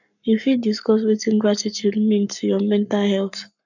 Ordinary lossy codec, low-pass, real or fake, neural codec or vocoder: none; 7.2 kHz; fake; codec, 44.1 kHz, 7.8 kbps, Pupu-Codec